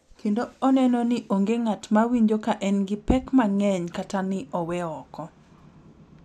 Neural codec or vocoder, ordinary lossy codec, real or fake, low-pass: none; none; real; 14.4 kHz